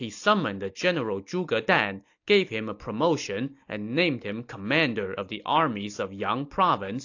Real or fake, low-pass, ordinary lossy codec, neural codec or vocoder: real; 7.2 kHz; AAC, 48 kbps; none